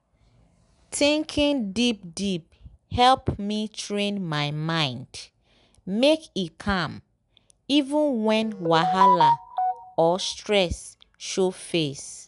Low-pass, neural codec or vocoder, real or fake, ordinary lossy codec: 10.8 kHz; none; real; none